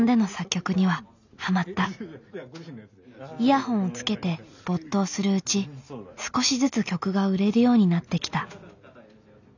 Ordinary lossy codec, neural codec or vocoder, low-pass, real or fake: none; none; 7.2 kHz; real